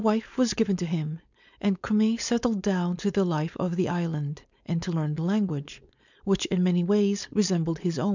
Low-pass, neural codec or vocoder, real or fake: 7.2 kHz; codec, 16 kHz, 4.8 kbps, FACodec; fake